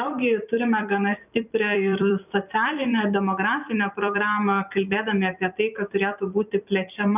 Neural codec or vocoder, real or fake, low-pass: vocoder, 44.1 kHz, 128 mel bands every 256 samples, BigVGAN v2; fake; 3.6 kHz